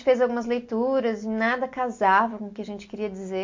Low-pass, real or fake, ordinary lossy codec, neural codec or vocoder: 7.2 kHz; real; MP3, 48 kbps; none